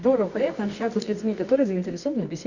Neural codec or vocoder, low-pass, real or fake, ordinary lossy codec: codec, 24 kHz, 0.9 kbps, WavTokenizer, medium music audio release; 7.2 kHz; fake; none